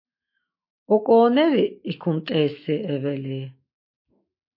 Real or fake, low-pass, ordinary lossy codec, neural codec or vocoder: fake; 5.4 kHz; MP3, 32 kbps; autoencoder, 48 kHz, 128 numbers a frame, DAC-VAE, trained on Japanese speech